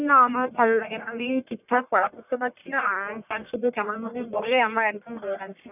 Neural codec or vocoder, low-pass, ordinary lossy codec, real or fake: codec, 44.1 kHz, 1.7 kbps, Pupu-Codec; 3.6 kHz; none; fake